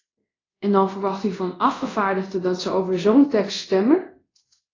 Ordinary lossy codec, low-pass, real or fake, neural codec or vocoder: AAC, 32 kbps; 7.2 kHz; fake; codec, 24 kHz, 0.5 kbps, DualCodec